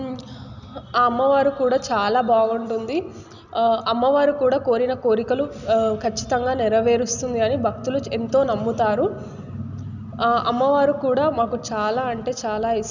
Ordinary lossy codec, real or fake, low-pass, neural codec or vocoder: none; real; 7.2 kHz; none